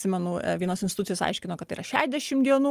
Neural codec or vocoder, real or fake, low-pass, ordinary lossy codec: none; real; 14.4 kHz; Opus, 32 kbps